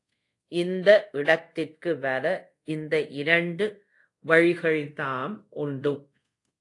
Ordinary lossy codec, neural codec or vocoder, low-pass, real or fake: AAC, 48 kbps; codec, 24 kHz, 0.5 kbps, DualCodec; 10.8 kHz; fake